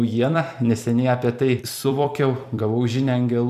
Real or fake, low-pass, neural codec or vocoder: fake; 14.4 kHz; vocoder, 44.1 kHz, 128 mel bands every 256 samples, BigVGAN v2